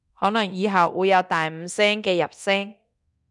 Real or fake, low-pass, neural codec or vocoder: fake; 10.8 kHz; codec, 24 kHz, 0.9 kbps, DualCodec